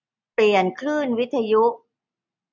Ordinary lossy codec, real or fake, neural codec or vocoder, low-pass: none; real; none; 7.2 kHz